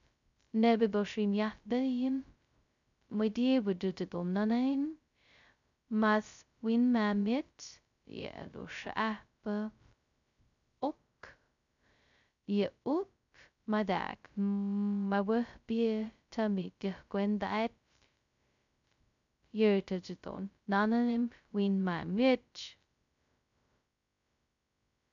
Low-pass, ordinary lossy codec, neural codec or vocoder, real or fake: 7.2 kHz; none; codec, 16 kHz, 0.2 kbps, FocalCodec; fake